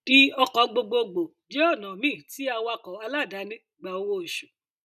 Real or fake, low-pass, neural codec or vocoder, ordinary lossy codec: real; 14.4 kHz; none; none